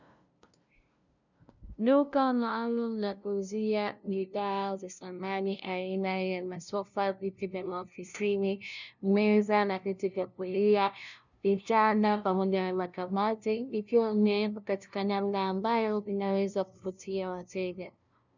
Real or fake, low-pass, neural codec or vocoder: fake; 7.2 kHz; codec, 16 kHz, 0.5 kbps, FunCodec, trained on LibriTTS, 25 frames a second